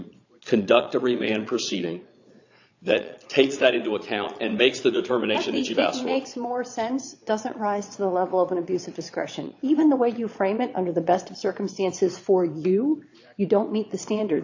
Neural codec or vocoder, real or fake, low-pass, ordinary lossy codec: vocoder, 22.05 kHz, 80 mel bands, Vocos; fake; 7.2 kHz; AAC, 48 kbps